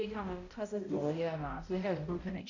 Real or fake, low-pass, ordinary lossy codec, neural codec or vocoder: fake; 7.2 kHz; none; codec, 16 kHz, 0.5 kbps, X-Codec, HuBERT features, trained on balanced general audio